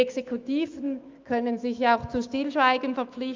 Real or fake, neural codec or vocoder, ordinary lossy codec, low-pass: fake; autoencoder, 48 kHz, 128 numbers a frame, DAC-VAE, trained on Japanese speech; Opus, 24 kbps; 7.2 kHz